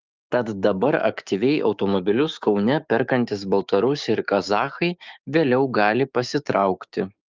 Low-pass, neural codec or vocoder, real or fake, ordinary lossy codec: 7.2 kHz; codec, 16 kHz, 6 kbps, DAC; fake; Opus, 24 kbps